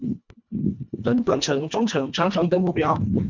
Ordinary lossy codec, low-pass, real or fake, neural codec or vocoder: MP3, 64 kbps; 7.2 kHz; fake; codec, 24 kHz, 1.5 kbps, HILCodec